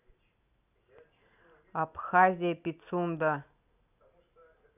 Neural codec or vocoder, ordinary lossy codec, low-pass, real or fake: none; none; 3.6 kHz; real